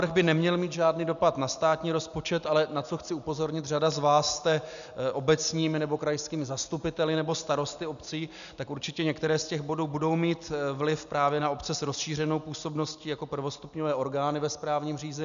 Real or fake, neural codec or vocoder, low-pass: real; none; 7.2 kHz